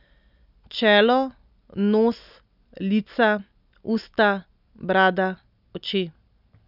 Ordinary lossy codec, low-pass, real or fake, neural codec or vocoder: none; 5.4 kHz; real; none